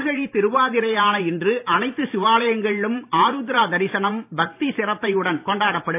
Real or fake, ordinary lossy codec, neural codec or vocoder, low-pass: fake; AAC, 32 kbps; vocoder, 44.1 kHz, 128 mel bands every 512 samples, BigVGAN v2; 3.6 kHz